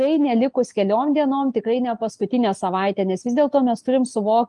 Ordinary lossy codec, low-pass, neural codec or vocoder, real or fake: Opus, 32 kbps; 10.8 kHz; none; real